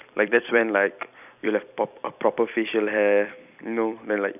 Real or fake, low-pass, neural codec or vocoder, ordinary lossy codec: fake; 3.6 kHz; vocoder, 44.1 kHz, 128 mel bands every 256 samples, BigVGAN v2; none